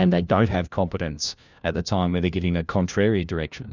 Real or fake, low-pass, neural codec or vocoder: fake; 7.2 kHz; codec, 16 kHz, 1 kbps, FunCodec, trained on LibriTTS, 50 frames a second